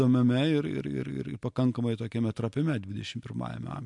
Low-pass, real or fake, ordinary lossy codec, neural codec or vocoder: 10.8 kHz; real; MP3, 64 kbps; none